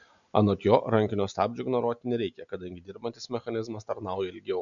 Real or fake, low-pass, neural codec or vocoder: real; 7.2 kHz; none